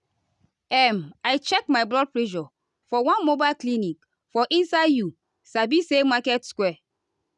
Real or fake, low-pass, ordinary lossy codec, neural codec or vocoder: real; 10.8 kHz; none; none